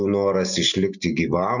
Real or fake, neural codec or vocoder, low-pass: real; none; 7.2 kHz